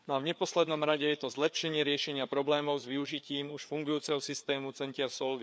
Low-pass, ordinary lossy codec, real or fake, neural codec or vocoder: none; none; fake; codec, 16 kHz, 4 kbps, FreqCodec, larger model